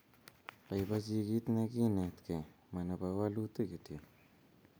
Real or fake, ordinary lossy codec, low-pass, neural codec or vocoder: real; none; none; none